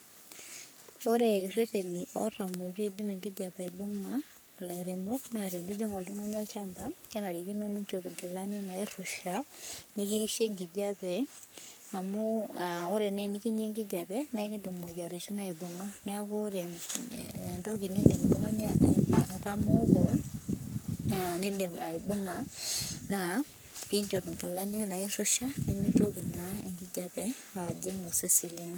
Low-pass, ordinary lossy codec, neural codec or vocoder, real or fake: none; none; codec, 44.1 kHz, 3.4 kbps, Pupu-Codec; fake